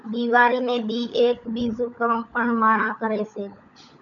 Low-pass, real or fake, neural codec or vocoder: 7.2 kHz; fake; codec, 16 kHz, 16 kbps, FunCodec, trained on LibriTTS, 50 frames a second